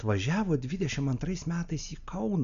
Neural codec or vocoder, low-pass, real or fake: none; 7.2 kHz; real